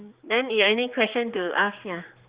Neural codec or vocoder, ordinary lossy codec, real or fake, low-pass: codec, 16 kHz, 4 kbps, X-Codec, HuBERT features, trained on general audio; Opus, 64 kbps; fake; 3.6 kHz